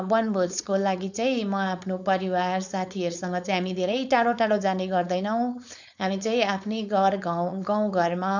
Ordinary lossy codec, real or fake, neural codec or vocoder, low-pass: none; fake; codec, 16 kHz, 4.8 kbps, FACodec; 7.2 kHz